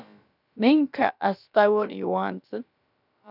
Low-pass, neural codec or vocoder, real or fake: 5.4 kHz; codec, 16 kHz, about 1 kbps, DyCAST, with the encoder's durations; fake